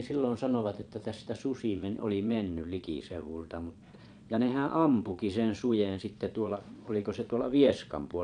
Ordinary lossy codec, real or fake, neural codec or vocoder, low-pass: none; fake; vocoder, 22.05 kHz, 80 mel bands, Vocos; 9.9 kHz